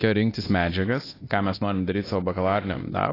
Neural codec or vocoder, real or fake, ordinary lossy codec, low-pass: codec, 24 kHz, 0.9 kbps, DualCodec; fake; AAC, 24 kbps; 5.4 kHz